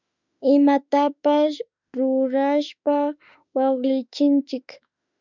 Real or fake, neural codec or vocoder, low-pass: fake; autoencoder, 48 kHz, 32 numbers a frame, DAC-VAE, trained on Japanese speech; 7.2 kHz